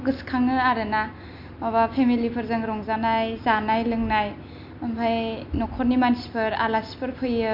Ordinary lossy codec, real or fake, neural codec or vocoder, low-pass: MP3, 48 kbps; real; none; 5.4 kHz